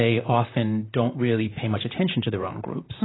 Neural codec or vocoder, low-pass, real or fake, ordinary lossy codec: vocoder, 44.1 kHz, 128 mel bands, Pupu-Vocoder; 7.2 kHz; fake; AAC, 16 kbps